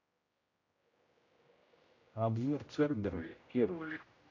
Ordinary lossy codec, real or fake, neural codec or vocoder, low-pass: AAC, 32 kbps; fake; codec, 16 kHz, 0.5 kbps, X-Codec, HuBERT features, trained on general audio; 7.2 kHz